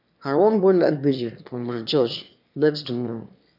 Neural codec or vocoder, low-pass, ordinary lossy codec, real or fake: autoencoder, 22.05 kHz, a latent of 192 numbers a frame, VITS, trained on one speaker; 5.4 kHz; none; fake